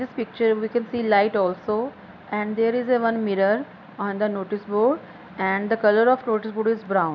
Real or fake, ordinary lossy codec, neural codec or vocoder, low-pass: real; none; none; 7.2 kHz